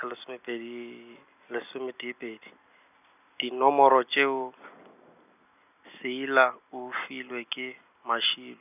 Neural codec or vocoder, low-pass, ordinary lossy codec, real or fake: none; 3.6 kHz; none; real